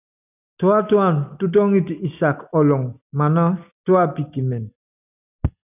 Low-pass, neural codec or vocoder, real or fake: 3.6 kHz; none; real